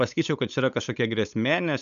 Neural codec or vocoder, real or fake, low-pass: codec, 16 kHz, 8 kbps, FunCodec, trained on LibriTTS, 25 frames a second; fake; 7.2 kHz